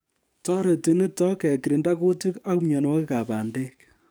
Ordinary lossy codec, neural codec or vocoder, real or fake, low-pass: none; codec, 44.1 kHz, 7.8 kbps, DAC; fake; none